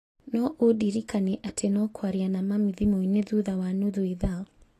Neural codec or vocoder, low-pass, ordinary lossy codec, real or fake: none; 14.4 kHz; AAC, 48 kbps; real